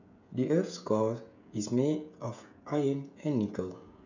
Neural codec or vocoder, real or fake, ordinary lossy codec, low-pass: codec, 16 kHz, 16 kbps, FreqCodec, smaller model; fake; none; 7.2 kHz